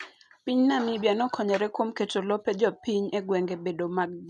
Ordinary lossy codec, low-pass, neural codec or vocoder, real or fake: none; none; none; real